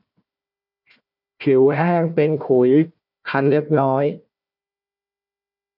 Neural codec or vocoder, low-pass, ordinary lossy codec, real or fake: codec, 16 kHz, 1 kbps, FunCodec, trained on Chinese and English, 50 frames a second; 5.4 kHz; none; fake